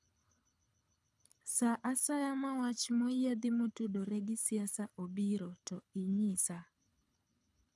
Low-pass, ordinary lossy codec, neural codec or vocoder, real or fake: none; none; codec, 24 kHz, 6 kbps, HILCodec; fake